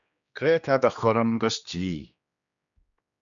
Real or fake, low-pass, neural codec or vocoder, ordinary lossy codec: fake; 7.2 kHz; codec, 16 kHz, 2 kbps, X-Codec, HuBERT features, trained on general audio; MP3, 96 kbps